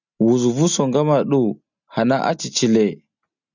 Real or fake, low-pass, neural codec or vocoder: real; 7.2 kHz; none